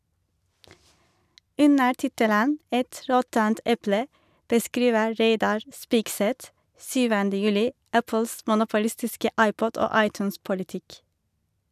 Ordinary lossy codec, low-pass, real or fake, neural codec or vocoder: none; 14.4 kHz; real; none